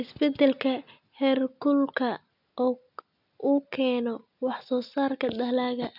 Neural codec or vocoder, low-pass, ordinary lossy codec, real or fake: none; 5.4 kHz; none; real